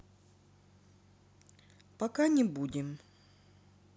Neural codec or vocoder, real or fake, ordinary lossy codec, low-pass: none; real; none; none